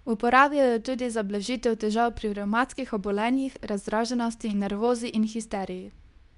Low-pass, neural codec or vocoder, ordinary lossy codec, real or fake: 10.8 kHz; codec, 24 kHz, 0.9 kbps, WavTokenizer, medium speech release version 2; none; fake